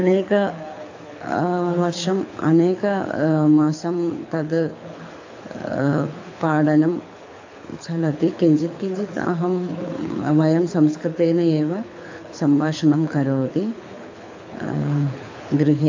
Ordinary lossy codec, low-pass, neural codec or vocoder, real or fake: AAC, 48 kbps; 7.2 kHz; codec, 24 kHz, 6 kbps, HILCodec; fake